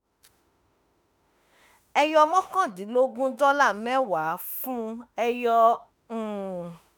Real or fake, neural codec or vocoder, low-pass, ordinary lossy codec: fake; autoencoder, 48 kHz, 32 numbers a frame, DAC-VAE, trained on Japanese speech; none; none